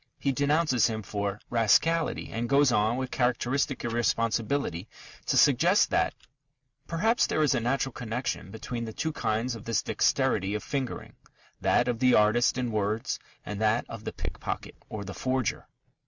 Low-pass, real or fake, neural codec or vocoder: 7.2 kHz; real; none